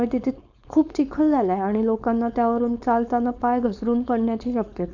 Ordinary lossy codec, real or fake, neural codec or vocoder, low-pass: none; fake; codec, 16 kHz, 4.8 kbps, FACodec; 7.2 kHz